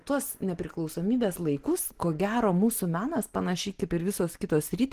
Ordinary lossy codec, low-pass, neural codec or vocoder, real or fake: Opus, 16 kbps; 14.4 kHz; none; real